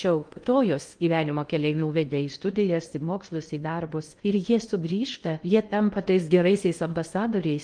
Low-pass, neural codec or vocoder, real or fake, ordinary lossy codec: 9.9 kHz; codec, 16 kHz in and 24 kHz out, 0.6 kbps, FocalCodec, streaming, 2048 codes; fake; Opus, 32 kbps